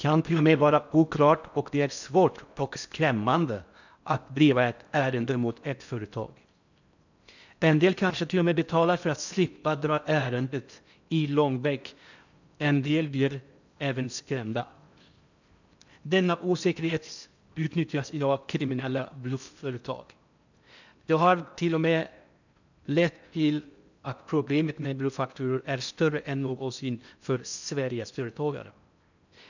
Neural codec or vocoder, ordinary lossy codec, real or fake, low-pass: codec, 16 kHz in and 24 kHz out, 0.6 kbps, FocalCodec, streaming, 4096 codes; none; fake; 7.2 kHz